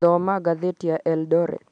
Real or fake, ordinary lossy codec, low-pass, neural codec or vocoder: real; none; 9.9 kHz; none